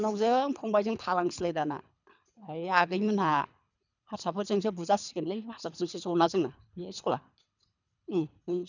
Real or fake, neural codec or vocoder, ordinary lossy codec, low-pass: fake; codec, 24 kHz, 3 kbps, HILCodec; none; 7.2 kHz